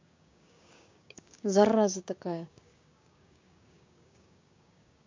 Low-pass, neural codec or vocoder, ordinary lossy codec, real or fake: 7.2 kHz; codec, 16 kHz, 6 kbps, DAC; MP3, 48 kbps; fake